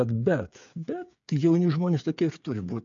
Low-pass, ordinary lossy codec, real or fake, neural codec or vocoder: 7.2 kHz; MP3, 64 kbps; fake; codec, 16 kHz, 8 kbps, FreqCodec, smaller model